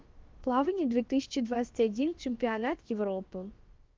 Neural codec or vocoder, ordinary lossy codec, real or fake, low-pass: codec, 16 kHz, about 1 kbps, DyCAST, with the encoder's durations; Opus, 32 kbps; fake; 7.2 kHz